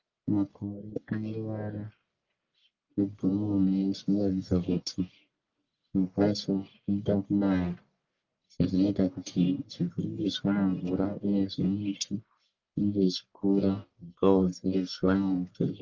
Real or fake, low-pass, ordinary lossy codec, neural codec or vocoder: fake; 7.2 kHz; Opus, 24 kbps; codec, 44.1 kHz, 1.7 kbps, Pupu-Codec